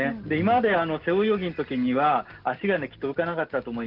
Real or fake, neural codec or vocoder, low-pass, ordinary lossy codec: real; none; 5.4 kHz; Opus, 16 kbps